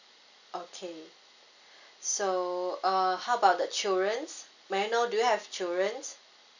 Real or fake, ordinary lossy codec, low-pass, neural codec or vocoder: real; none; 7.2 kHz; none